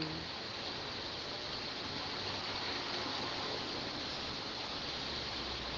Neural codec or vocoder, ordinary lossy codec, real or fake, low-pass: none; Opus, 16 kbps; real; 7.2 kHz